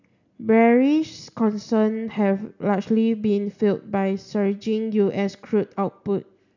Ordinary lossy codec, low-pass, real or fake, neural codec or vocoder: none; 7.2 kHz; real; none